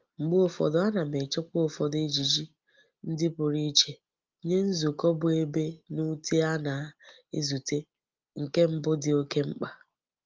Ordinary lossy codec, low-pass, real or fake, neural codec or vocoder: Opus, 24 kbps; 7.2 kHz; real; none